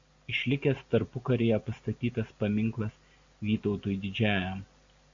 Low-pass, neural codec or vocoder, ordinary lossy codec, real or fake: 7.2 kHz; none; Opus, 64 kbps; real